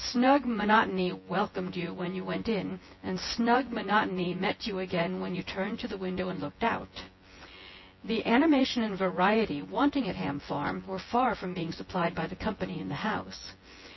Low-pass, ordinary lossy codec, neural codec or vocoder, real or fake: 7.2 kHz; MP3, 24 kbps; vocoder, 24 kHz, 100 mel bands, Vocos; fake